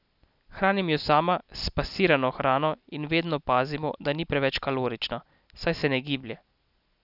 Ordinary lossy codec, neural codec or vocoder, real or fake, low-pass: none; none; real; 5.4 kHz